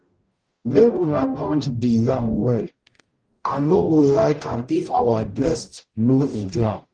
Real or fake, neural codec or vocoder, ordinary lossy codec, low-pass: fake; codec, 44.1 kHz, 0.9 kbps, DAC; Opus, 24 kbps; 9.9 kHz